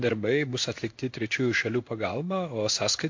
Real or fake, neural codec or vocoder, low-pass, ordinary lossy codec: fake; codec, 16 kHz in and 24 kHz out, 1 kbps, XY-Tokenizer; 7.2 kHz; MP3, 48 kbps